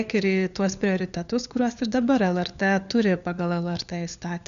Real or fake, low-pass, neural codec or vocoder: fake; 7.2 kHz; codec, 16 kHz, 4 kbps, FunCodec, trained on LibriTTS, 50 frames a second